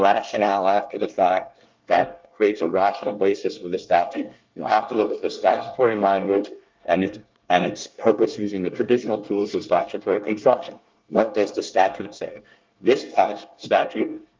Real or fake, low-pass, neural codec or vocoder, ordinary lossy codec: fake; 7.2 kHz; codec, 24 kHz, 1 kbps, SNAC; Opus, 24 kbps